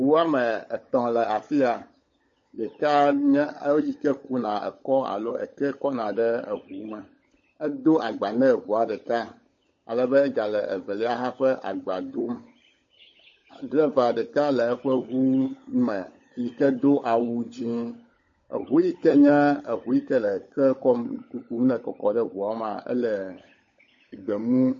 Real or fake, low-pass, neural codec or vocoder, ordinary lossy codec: fake; 7.2 kHz; codec, 16 kHz, 16 kbps, FunCodec, trained on LibriTTS, 50 frames a second; MP3, 32 kbps